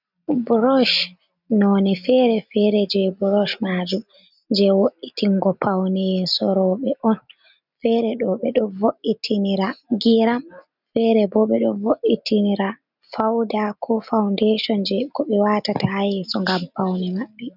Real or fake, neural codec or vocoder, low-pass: real; none; 5.4 kHz